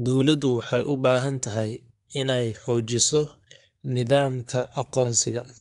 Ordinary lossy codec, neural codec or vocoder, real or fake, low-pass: none; codec, 24 kHz, 1 kbps, SNAC; fake; 10.8 kHz